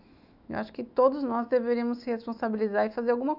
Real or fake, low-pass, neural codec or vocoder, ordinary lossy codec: fake; 5.4 kHz; autoencoder, 48 kHz, 128 numbers a frame, DAC-VAE, trained on Japanese speech; none